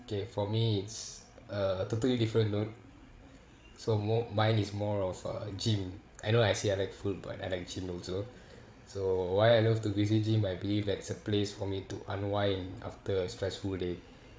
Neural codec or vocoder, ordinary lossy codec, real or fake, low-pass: codec, 16 kHz, 8 kbps, FreqCodec, larger model; none; fake; none